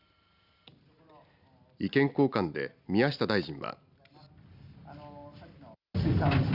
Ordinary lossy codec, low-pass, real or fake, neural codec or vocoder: Opus, 64 kbps; 5.4 kHz; real; none